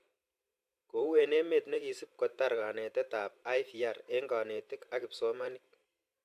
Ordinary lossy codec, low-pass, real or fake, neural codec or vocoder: AAC, 96 kbps; 14.4 kHz; fake; vocoder, 44.1 kHz, 128 mel bands every 512 samples, BigVGAN v2